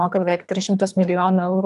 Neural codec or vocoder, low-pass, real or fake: codec, 24 kHz, 3 kbps, HILCodec; 10.8 kHz; fake